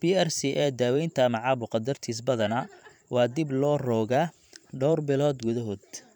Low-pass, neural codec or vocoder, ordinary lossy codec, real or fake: 19.8 kHz; vocoder, 44.1 kHz, 128 mel bands every 512 samples, BigVGAN v2; none; fake